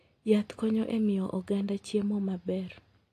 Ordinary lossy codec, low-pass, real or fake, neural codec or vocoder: AAC, 48 kbps; 14.4 kHz; real; none